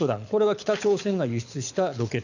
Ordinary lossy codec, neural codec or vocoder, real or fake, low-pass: none; codec, 24 kHz, 6 kbps, HILCodec; fake; 7.2 kHz